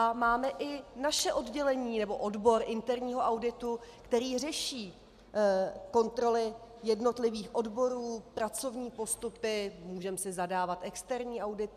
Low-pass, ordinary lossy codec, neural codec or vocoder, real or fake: 14.4 kHz; MP3, 96 kbps; none; real